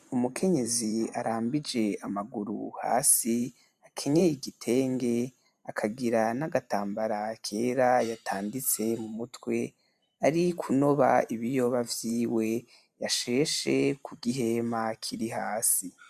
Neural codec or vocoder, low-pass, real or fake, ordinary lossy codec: vocoder, 44.1 kHz, 128 mel bands every 512 samples, BigVGAN v2; 14.4 kHz; fake; MP3, 96 kbps